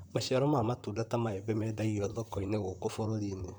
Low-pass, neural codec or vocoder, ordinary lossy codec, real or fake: none; vocoder, 44.1 kHz, 128 mel bands, Pupu-Vocoder; none; fake